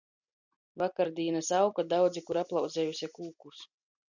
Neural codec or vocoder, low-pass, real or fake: none; 7.2 kHz; real